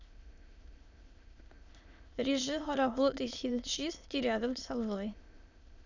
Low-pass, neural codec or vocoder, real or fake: 7.2 kHz; autoencoder, 22.05 kHz, a latent of 192 numbers a frame, VITS, trained on many speakers; fake